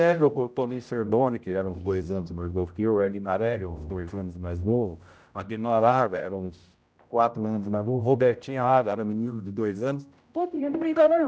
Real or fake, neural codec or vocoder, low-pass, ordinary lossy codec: fake; codec, 16 kHz, 0.5 kbps, X-Codec, HuBERT features, trained on general audio; none; none